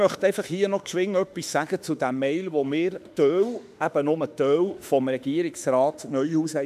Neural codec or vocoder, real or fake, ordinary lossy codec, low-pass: autoencoder, 48 kHz, 32 numbers a frame, DAC-VAE, trained on Japanese speech; fake; none; 14.4 kHz